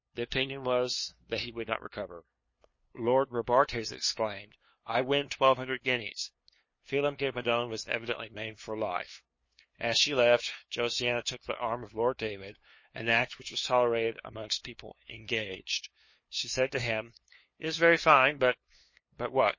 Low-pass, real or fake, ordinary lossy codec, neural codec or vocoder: 7.2 kHz; fake; MP3, 32 kbps; codec, 16 kHz, 2 kbps, FunCodec, trained on LibriTTS, 25 frames a second